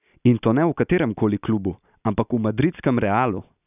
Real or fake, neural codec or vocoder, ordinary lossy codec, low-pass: real; none; none; 3.6 kHz